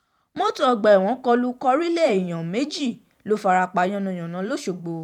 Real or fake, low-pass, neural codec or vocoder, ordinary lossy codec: fake; 19.8 kHz; vocoder, 44.1 kHz, 128 mel bands every 512 samples, BigVGAN v2; none